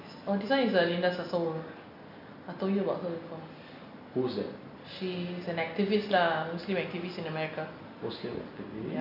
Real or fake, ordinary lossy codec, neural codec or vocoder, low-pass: real; AAC, 48 kbps; none; 5.4 kHz